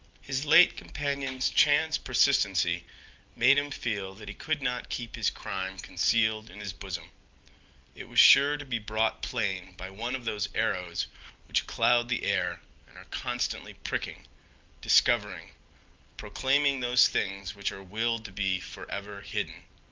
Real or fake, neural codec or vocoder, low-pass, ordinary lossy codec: real; none; 7.2 kHz; Opus, 32 kbps